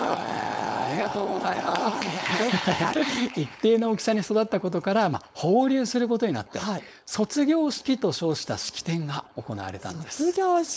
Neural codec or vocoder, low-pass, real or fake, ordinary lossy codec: codec, 16 kHz, 4.8 kbps, FACodec; none; fake; none